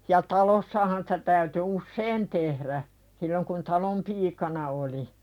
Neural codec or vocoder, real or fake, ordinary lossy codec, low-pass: vocoder, 44.1 kHz, 128 mel bands every 256 samples, BigVGAN v2; fake; none; 19.8 kHz